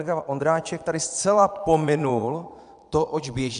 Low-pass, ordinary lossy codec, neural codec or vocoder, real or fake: 9.9 kHz; AAC, 96 kbps; vocoder, 22.05 kHz, 80 mel bands, Vocos; fake